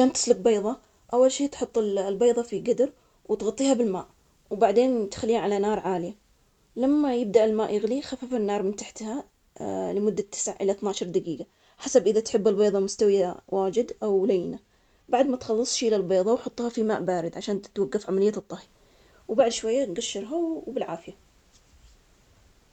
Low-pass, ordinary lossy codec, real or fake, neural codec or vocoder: 19.8 kHz; none; real; none